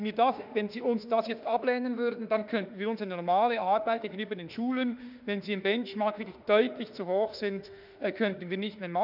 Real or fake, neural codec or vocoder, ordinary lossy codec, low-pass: fake; autoencoder, 48 kHz, 32 numbers a frame, DAC-VAE, trained on Japanese speech; none; 5.4 kHz